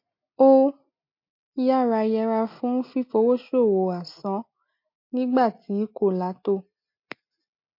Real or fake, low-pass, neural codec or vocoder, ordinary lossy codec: real; 5.4 kHz; none; MP3, 32 kbps